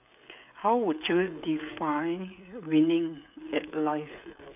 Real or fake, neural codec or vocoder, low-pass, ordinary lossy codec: fake; codec, 16 kHz, 16 kbps, FreqCodec, smaller model; 3.6 kHz; none